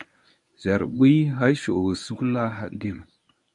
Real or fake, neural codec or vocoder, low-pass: fake; codec, 24 kHz, 0.9 kbps, WavTokenizer, medium speech release version 1; 10.8 kHz